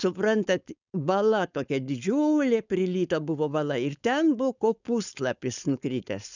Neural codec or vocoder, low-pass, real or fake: codec, 16 kHz, 4.8 kbps, FACodec; 7.2 kHz; fake